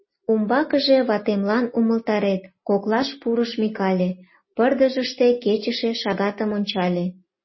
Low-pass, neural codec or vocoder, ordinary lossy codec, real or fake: 7.2 kHz; none; MP3, 24 kbps; real